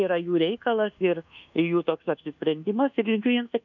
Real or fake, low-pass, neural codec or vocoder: fake; 7.2 kHz; codec, 24 kHz, 1.2 kbps, DualCodec